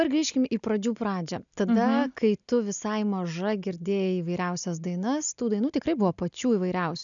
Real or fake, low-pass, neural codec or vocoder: real; 7.2 kHz; none